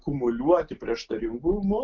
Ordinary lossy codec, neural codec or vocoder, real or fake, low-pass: Opus, 32 kbps; none; real; 7.2 kHz